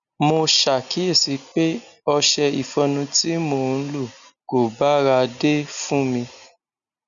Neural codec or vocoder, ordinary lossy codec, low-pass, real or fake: none; MP3, 96 kbps; 7.2 kHz; real